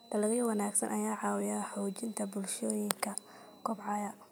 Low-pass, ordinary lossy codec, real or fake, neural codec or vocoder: none; none; real; none